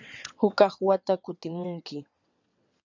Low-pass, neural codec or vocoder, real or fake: 7.2 kHz; vocoder, 22.05 kHz, 80 mel bands, WaveNeXt; fake